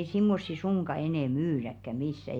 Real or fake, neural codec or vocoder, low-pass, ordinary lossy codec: real; none; 19.8 kHz; none